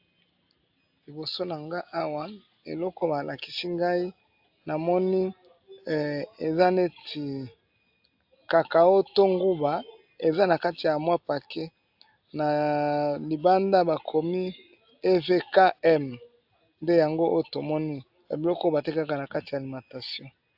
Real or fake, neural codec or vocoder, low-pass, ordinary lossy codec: real; none; 5.4 kHz; AAC, 48 kbps